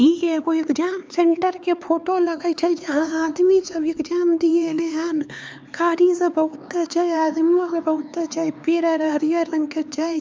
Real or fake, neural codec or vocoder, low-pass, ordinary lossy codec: fake; codec, 16 kHz, 4 kbps, X-Codec, HuBERT features, trained on LibriSpeech; none; none